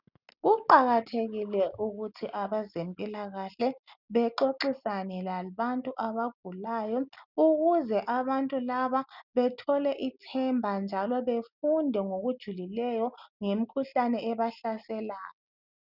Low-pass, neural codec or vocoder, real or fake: 5.4 kHz; none; real